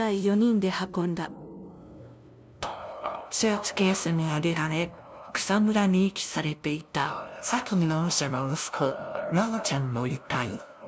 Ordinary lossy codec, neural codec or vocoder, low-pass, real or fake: none; codec, 16 kHz, 0.5 kbps, FunCodec, trained on LibriTTS, 25 frames a second; none; fake